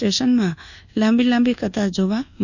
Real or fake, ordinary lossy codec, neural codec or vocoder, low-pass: fake; none; codec, 24 kHz, 1.2 kbps, DualCodec; 7.2 kHz